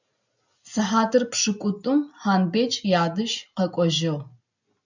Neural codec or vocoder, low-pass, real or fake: none; 7.2 kHz; real